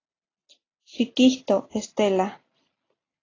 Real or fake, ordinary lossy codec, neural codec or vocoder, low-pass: real; AAC, 32 kbps; none; 7.2 kHz